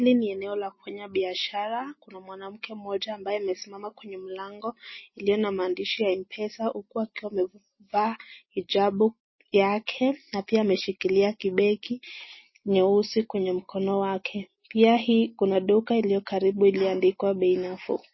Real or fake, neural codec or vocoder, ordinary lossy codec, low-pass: real; none; MP3, 24 kbps; 7.2 kHz